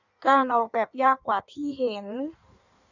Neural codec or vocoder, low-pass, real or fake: codec, 16 kHz in and 24 kHz out, 1.1 kbps, FireRedTTS-2 codec; 7.2 kHz; fake